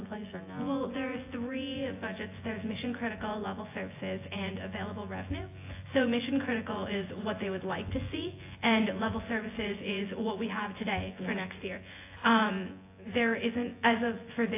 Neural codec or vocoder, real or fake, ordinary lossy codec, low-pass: vocoder, 24 kHz, 100 mel bands, Vocos; fake; AAC, 24 kbps; 3.6 kHz